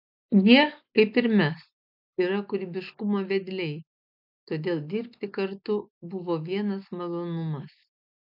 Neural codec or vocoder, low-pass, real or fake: none; 5.4 kHz; real